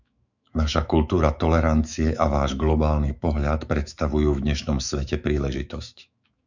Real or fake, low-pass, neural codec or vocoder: fake; 7.2 kHz; autoencoder, 48 kHz, 128 numbers a frame, DAC-VAE, trained on Japanese speech